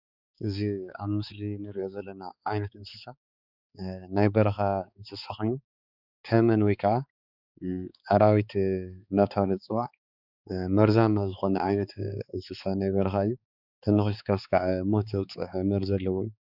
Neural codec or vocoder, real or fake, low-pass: codec, 16 kHz, 4 kbps, X-Codec, HuBERT features, trained on balanced general audio; fake; 5.4 kHz